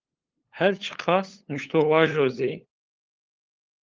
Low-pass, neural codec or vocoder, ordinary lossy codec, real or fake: 7.2 kHz; codec, 16 kHz, 8 kbps, FunCodec, trained on LibriTTS, 25 frames a second; Opus, 32 kbps; fake